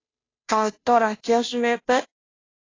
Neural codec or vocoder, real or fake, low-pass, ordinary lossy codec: codec, 16 kHz, 0.5 kbps, FunCodec, trained on Chinese and English, 25 frames a second; fake; 7.2 kHz; AAC, 32 kbps